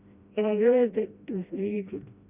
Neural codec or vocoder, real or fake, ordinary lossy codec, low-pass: codec, 16 kHz, 1 kbps, FreqCodec, smaller model; fake; Opus, 64 kbps; 3.6 kHz